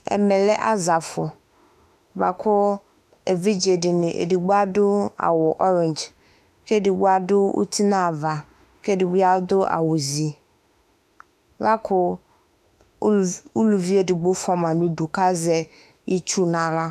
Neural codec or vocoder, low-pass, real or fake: autoencoder, 48 kHz, 32 numbers a frame, DAC-VAE, trained on Japanese speech; 14.4 kHz; fake